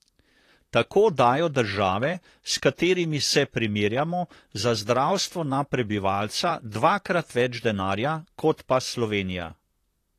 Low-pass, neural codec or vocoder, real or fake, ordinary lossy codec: 14.4 kHz; vocoder, 44.1 kHz, 128 mel bands, Pupu-Vocoder; fake; AAC, 48 kbps